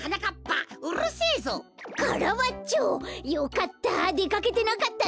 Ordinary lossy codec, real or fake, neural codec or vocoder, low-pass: none; real; none; none